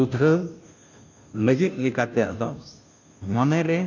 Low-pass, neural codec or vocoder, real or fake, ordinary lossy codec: 7.2 kHz; codec, 16 kHz, 0.5 kbps, FunCodec, trained on Chinese and English, 25 frames a second; fake; none